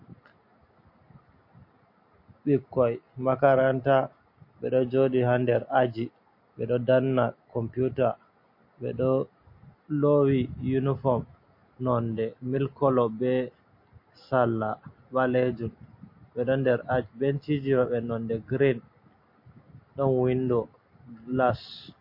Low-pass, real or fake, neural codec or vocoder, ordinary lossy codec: 5.4 kHz; real; none; MP3, 32 kbps